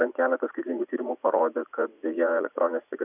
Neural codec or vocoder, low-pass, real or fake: vocoder, 44.1 kHz, 80 mel bands, Vocos; 3.6 kHz; fake